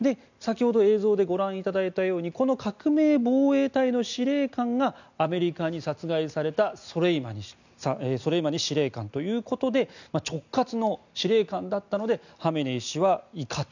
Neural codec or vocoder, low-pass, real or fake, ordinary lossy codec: none; 7.2 kHz; real; none